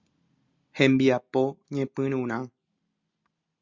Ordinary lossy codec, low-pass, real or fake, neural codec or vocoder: Opus, 64 kbps; 7.2 kHz; real; none